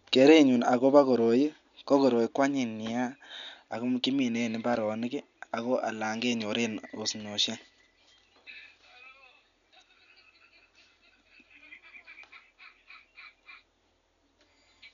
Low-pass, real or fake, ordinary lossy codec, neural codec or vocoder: 7.2 kHz; real; none; none